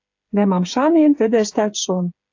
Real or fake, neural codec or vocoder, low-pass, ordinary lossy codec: fake; codec, 16 kHz, 8 kbps, FreqCodec, smaller model; 7.2 kHz; AAC, 48 kbps